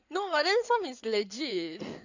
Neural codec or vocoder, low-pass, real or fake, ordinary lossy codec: codec, 16 kHz in and 24 kHz out, 2.2 kbps, FireRedTTS-2 codec; 7.2 kHz; fake; none